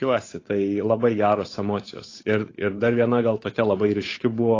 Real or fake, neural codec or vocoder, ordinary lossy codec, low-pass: fake; codec, 16 kHz, 8 kbps, FunCodec, trained on Chinese and English, 25 frames a second; AAC, 32 kbps; 7.2 kHz